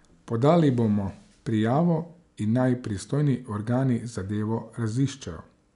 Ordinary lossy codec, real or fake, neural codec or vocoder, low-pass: none; real; none; 10.8 kHz